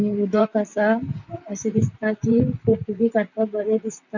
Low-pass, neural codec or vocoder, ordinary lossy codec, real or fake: 7.2 kHz; vocoder, 44.1 kHz, 128 mel bands, Pupu-Vocoder; none; fake